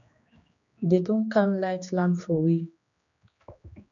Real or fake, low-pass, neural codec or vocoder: fake; 7.2 kHz; codec, 16 kHz, 2 kbps, X-Codec, HuBERT features, trained on general audio